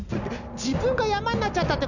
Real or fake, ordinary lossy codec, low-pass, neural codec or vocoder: real; none; 7.2 kHz; none